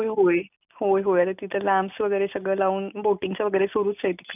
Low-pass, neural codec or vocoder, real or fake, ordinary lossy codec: 3.6 kHz; none; real; none